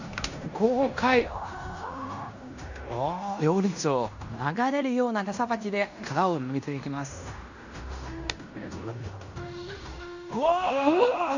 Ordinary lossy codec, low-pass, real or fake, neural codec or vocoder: none; 7.2 kHz; fake; codec, 16 kHz in and 24 kHz out, 0.9 kbps, LongCat-Audio-Codec, fine tuned four codebook decoder